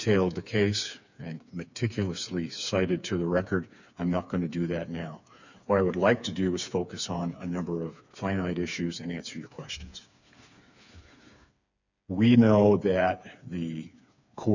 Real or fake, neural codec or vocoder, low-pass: fake; codec, 16 kHz, 4 kbps, FreqCodec, smaller model; 7.2 kHz